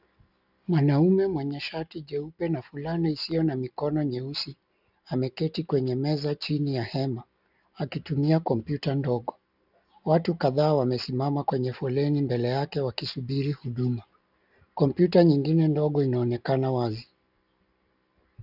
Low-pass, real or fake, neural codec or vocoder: 5.4 kHz; real; none